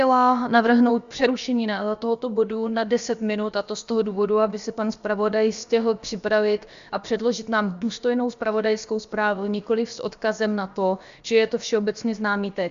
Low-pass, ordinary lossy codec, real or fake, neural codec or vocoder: 7.2 kHz; Opus, 64 kbps; fake; codec, 16 kHz, about 1 kbps, DyCAST, with the encoder's durations